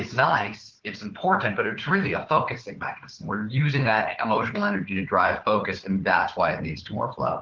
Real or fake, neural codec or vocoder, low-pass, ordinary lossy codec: fake; codec, 16 kHz, 4 kbps, FunCodec, trained on Chinese and English, 50 frames a second; 7.2 kHz; Opus, 16 kbps